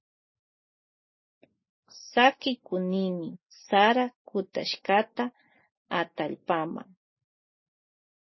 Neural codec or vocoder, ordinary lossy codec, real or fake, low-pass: none; MP3, 24 kbps; real; 7.2 kHz